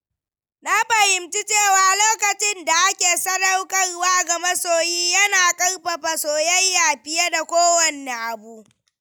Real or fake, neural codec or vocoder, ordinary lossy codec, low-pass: real; none; none; none